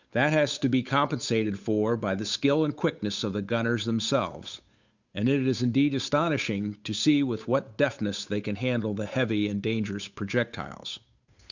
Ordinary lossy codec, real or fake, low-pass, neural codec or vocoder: Opus, 64 kbps; fake; 7.2 kHz; codec, 16 kHz, 8 kbps, FunCodec, trained on Chinese and English, 25 frames a second